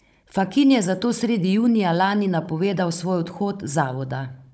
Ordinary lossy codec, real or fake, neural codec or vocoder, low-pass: none; fake; codec, 16 kHz, 16 kbps, FunCodec, trained on Chinese and English, 50 frames a second; none